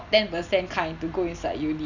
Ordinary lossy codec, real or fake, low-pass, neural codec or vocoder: none; real; 7.2 kHz; none